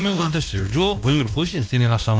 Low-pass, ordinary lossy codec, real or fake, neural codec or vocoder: none; none; fake; codec, 16 kHz, 1 kbps, X-Codec, WavLM features, trained on Multilingual LibriSpeech